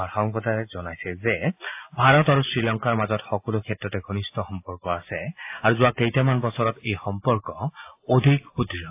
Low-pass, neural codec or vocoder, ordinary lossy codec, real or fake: 3.6 kHz; none; AAC, 32 kbps; real